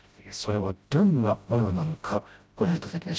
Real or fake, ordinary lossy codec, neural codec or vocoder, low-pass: fake; none; codec, 16 kHz, 0.5 kbps, FreqCodec, smaller model; none